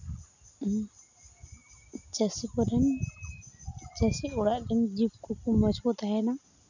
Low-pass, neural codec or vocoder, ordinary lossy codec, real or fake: 7.2 kHz; none; none; real